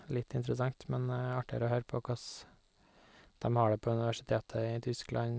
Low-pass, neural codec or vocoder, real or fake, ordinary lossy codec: none; none; real; none